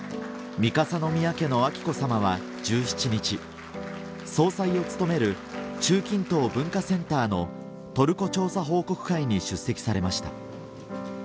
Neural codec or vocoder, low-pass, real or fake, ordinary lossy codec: none; none; real; none